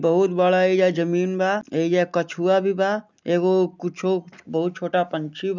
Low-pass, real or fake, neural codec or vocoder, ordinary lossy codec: 7.2 kHz; real; none; none